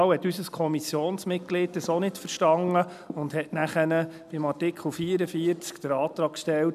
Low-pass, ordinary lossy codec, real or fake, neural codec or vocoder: 14.4 kHz; none; real; none